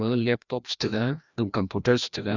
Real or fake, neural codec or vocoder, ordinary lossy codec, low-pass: fake; codec, 16 kHz, 1 kbps, FreqCodec, larger model; none; 7.2 kHz